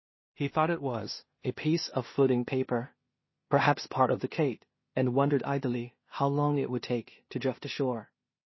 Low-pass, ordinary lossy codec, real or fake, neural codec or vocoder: 7.2 kHz; MP3, 24 kbps; fake; codec, 16 kHz in and 24 kHz out, 0.4 kbps, LongCat-Audio-Codec, two codebook decoder